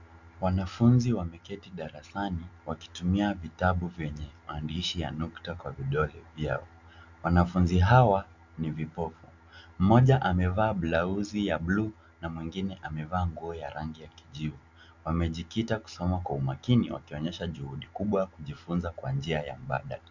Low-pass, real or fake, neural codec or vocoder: 7.2 kHz; real; none